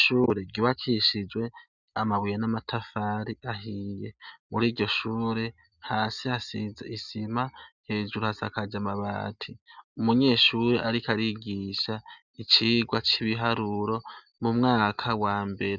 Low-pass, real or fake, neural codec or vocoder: 7.2 kHz; real; none